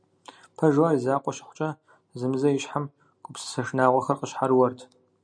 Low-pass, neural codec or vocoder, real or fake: 9.9 kHz; none; real